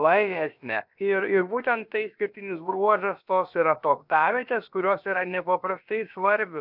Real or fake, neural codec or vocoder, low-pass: fake; codec, 16 kHz, about 1 kbps, DyCAST, with the encoder's durations; 5.4 kHz